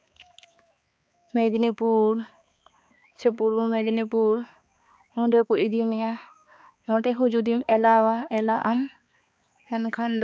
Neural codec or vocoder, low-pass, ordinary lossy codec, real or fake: codec, 16 kHz, 2 kbps, X-Codec, HuBERT features, trained on balanced general audio; none; none; fake